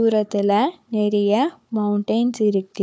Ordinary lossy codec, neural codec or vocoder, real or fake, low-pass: none; codec, 16 kHz, 4 kbps, FunCodec, trained on Chinese and English, 50 frames a second; fake; none